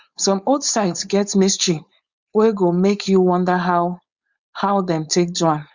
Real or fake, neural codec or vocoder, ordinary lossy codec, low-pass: fake; codec, 16 kHz, 4.8 kbps, FACodec; Opus, 64 kbps; 7.2 kHz